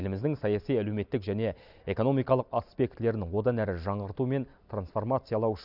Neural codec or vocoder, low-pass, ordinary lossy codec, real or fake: none; 5.4 kHz; none; real